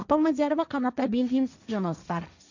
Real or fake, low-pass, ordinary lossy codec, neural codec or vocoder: fake; 7.2 kHz; none; codec, 16 kHz, 1.1 kbps, Voila-Tokenizer